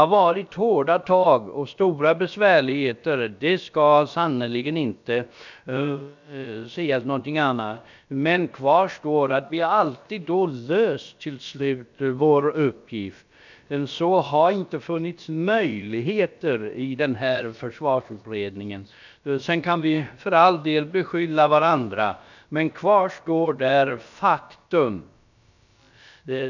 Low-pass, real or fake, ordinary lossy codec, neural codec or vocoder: 7.2 kHz; fake; none; codec, 16 kHz, about 1 kbps, DyCAST, with the encoder's durations